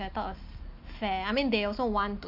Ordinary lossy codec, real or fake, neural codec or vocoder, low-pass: none; real; none; 5.4 kHz